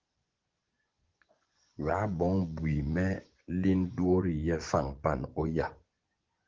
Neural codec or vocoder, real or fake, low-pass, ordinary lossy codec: vocoder, 24 kHz, 100 mel bands, Vocos; fake; 7.2 kHz; Opus, 16 kbps